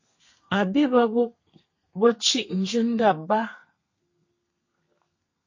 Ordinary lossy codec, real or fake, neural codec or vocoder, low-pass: MP3, 32 kbps; fake; codec, 32 kHz, 1.9 kbps, SNAC; 7.2 kHz